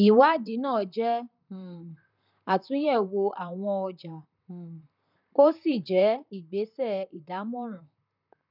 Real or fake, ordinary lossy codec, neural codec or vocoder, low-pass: fake; none; vocoder, 44.1 kHz, 128 mel bands, Pupu-Vocoder; 5.4 kHz